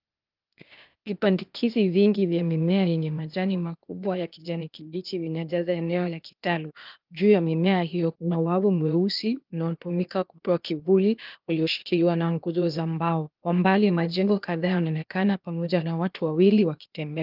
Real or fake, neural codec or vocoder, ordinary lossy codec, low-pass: fake; codec, 16 kHz, 0.8 kbps, ZipCodec; Opus, 24 kbps; 5.4 kHz